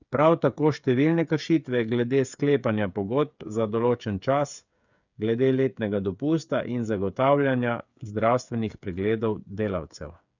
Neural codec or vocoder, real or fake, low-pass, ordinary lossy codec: codec, 16 kHz, 8 kbps, FreqCodec, smaller model; fake; 7.2 kHz; none